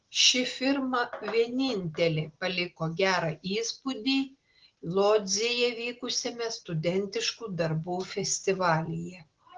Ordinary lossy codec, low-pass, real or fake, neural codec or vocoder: Opus, 16 kbps; 7.2 kHz; real; none